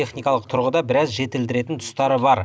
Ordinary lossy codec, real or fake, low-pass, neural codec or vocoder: none; real; none; none